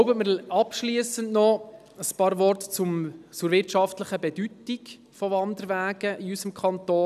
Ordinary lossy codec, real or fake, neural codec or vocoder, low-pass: none; real; none; 14.4 kHz